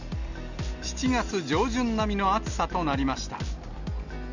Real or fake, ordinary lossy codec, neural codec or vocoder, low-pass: real; none; none; 7.2 kHz